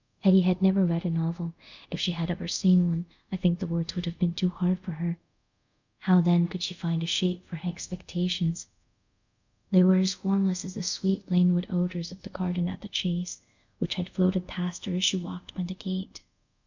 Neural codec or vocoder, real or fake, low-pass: codec, 24 kHz, 0.5 kbps, DualCodec; fake; 7.2 kHz